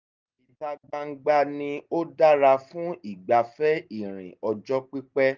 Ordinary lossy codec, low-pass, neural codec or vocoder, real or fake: Opus, 24 kbps; 7.2 kHz; none; real